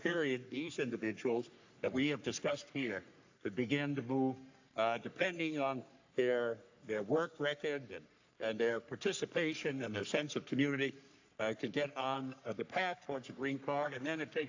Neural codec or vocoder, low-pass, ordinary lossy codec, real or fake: codec, 44.1 kHz, 3.4 kbps, Pupu-Codec; 7.2 kHz; AAC, 48 kbps; fake